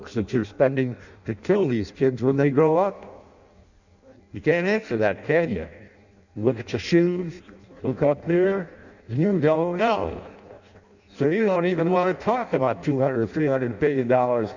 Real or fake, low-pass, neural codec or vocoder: fake; 7.2 kHz; codec, 16 kHz in and 24 kHz out, 0.6 kbps, FireRedTTS-2 codec